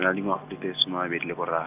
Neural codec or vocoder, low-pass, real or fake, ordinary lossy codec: none; 3.6 kHz; real; none